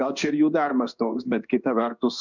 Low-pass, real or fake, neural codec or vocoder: 7.2 kHz; fake; codec, 16 kHz in and 24 kHz out, 1 kbps, XY-Tokenizer